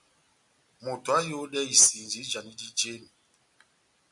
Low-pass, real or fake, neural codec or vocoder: 10.8 kHz; real; none